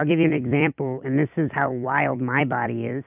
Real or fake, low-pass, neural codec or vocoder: fake; 3.6 kHz; vocoder, 44.1 kHz, 128 mel bands every 256 samples, BigVGAN v2